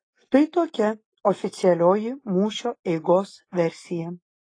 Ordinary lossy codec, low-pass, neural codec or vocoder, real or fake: AAC, 32 kbps; 9.9 kHz; none; real